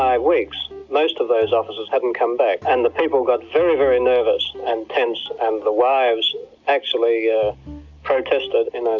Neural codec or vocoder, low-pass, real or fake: none; 7.2 kHz; real